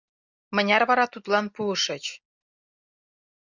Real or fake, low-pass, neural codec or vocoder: real; 7.2 kHz; none